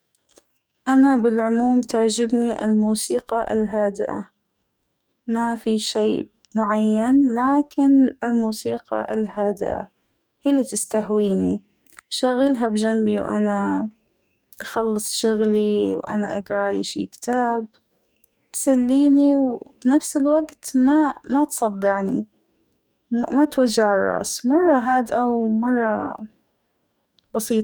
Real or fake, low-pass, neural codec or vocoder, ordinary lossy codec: fake; none; codec, 44.1 kHz, 2.6 kbps, DAC; none